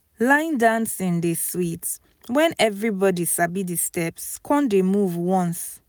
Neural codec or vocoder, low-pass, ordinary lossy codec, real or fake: none; none; none; real